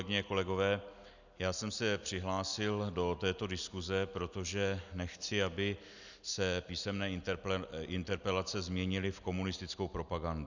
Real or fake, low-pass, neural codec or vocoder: real; 7.2 kHz; none